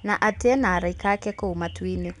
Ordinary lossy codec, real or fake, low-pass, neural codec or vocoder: none; real; 10.8 kHz; none